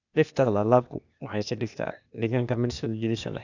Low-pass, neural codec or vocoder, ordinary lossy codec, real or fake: 7.2 kHz; codec, 16 kHz, 0.8 kbps, ZipCodec; none; fake